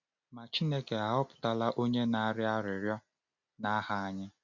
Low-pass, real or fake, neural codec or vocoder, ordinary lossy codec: 7.2 kHz; real; none; none